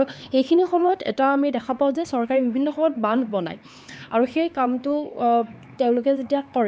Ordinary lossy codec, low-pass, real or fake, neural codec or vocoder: none; none; fake; codec, 16 kHz, 4 kbps, X-Codec, HuBERT features, trained on LibriSpeech